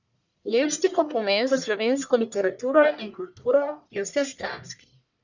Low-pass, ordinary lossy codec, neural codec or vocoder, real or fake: 7.2 kHz; none; codec, 44.1 kHz, 1.7 kbps, Pupu-Codec; fake